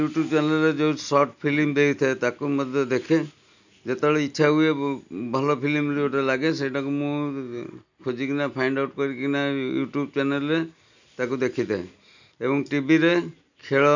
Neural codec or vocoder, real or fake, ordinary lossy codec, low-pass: none; real; none; 7.2 kHz